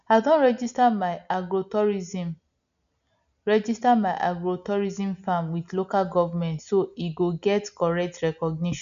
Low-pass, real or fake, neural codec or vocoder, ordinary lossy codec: 7.2 kHz; real; none; none